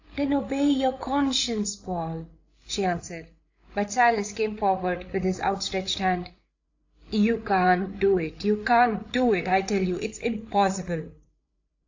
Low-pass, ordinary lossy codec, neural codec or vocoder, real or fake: 7.2 kHz; AAC, 48 kbps; codec, 16 kHz, 8 kbps, FreqCodec, larger model; fake